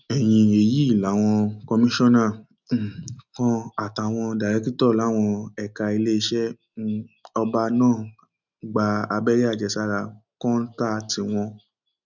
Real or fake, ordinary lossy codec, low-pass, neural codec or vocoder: real; none; 7.2 kHz; none